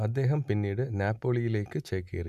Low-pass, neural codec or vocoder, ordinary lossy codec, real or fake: 14.4 kHz; none; none; real